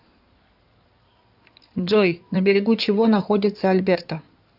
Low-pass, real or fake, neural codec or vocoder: 5.4 kHz; fake; codec, 16 kHz in and 24 kHz out, 2.2 kbps, FireRedTTS-2 codec